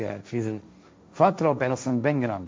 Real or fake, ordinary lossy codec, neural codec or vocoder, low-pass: fake; none; codec, 16 kHz, 1.1 kbps, Voila-Tokenizer; none